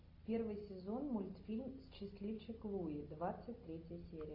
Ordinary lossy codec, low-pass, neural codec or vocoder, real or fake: AAC, 48 kbps; 5.4 kHz; none; real